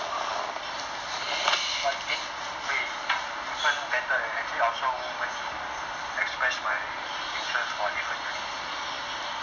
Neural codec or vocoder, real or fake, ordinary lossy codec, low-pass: none; real; none; 7.2 kHz